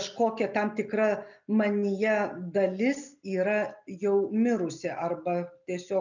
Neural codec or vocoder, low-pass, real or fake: none; 7.2 kHz; real